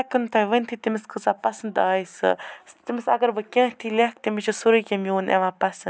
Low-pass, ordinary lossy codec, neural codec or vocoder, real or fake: none; none; none; real